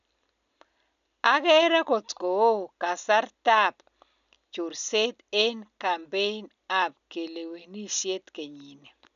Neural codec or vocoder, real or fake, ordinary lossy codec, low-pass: none; real; none; 7.2 kHz